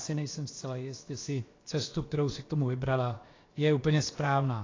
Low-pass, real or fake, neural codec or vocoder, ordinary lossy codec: 7.2 kHz; fake; codec, 16 kHz, about 1 kbps, DyCAST, with the encoder's durations; AAC, 32 kbps